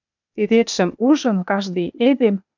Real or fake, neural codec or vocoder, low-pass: fake; codec, 16 kHz, 0.8 kbps, ZipCodec; 7.2 kHz